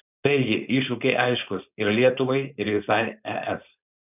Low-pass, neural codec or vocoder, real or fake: 3.6 kHz; codec, 16 kHz, 4.8 kbps, FACodec; fake